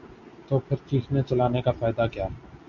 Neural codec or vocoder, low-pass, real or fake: none; 7.2 kHz; real